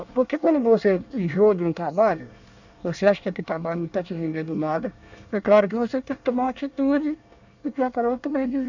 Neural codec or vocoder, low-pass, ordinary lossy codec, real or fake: codec, 24 kHz, 1 kbps, SNAC; 7.2 kHz; none; fake